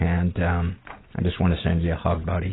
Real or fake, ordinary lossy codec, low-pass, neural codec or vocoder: real; AAC, 16 kbps; 7.2 kHz; none